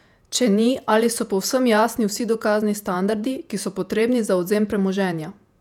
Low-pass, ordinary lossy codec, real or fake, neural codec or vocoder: 19.8 kHz; none; fake; vocoder, 48 kHz, 128 mel bands, Vocos